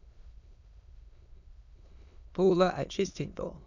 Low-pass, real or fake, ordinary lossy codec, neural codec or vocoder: 7.2 kHz; fake; none; autoencoder, 22.05 kHz, a latent of 192 numbers a frame, VITS, trained on many speakers